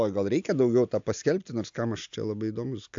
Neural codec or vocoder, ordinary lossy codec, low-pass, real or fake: none; AAC, 64 kbps; 7.2 kHz; real